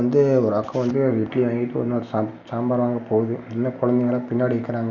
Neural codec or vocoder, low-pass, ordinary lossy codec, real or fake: none; 7.2 kHz; none; real